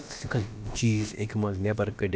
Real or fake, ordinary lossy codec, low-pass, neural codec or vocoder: fake; none; none; codec, 16 kHz, about 1 kbps, DyCAST, with the encoder's durations